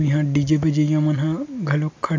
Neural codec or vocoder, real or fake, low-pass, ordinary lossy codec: none; real; 7.2 kHz; AAC, 48 kbps